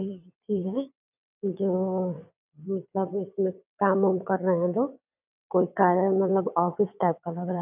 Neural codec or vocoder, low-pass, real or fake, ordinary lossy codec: vocoder, 22.05 kHz, 80 mel bands, WaveNeXt; 3.6 kHz; fake; none